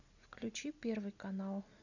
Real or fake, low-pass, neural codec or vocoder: real; 7.2 kHz; none